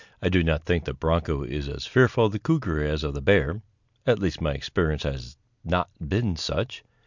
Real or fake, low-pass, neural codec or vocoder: real; 7.2 kHz; none